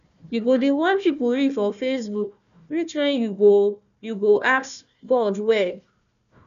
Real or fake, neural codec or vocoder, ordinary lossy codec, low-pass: fake; codec, 16 kHz, 1 kbps, FunCodec, trained on Chinese and English, 50 frames a second; none; 7.2 kHz